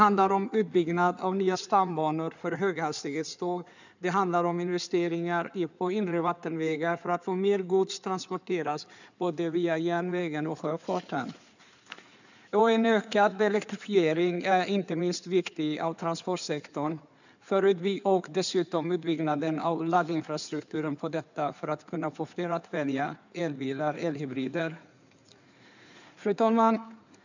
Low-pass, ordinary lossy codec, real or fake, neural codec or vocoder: 7.2 kHz; none; fake; codec, 16 kHz in and 24 kHz out, 2.2 kbps, FireRedTTS-2 codec